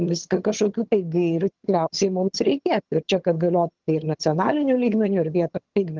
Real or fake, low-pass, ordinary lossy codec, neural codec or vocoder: fake; 7.2 kHz; Opus, 16 kbps; vocoder, 22.05 kHz, 80 mel bands, HiFi-GAN